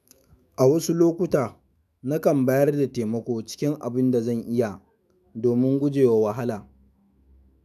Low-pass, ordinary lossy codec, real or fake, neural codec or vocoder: 14.4 kHz; none; fake; autoencoder, 48 kHz, 128 numbers a frame, DAC-VAE, trained on Japanese speech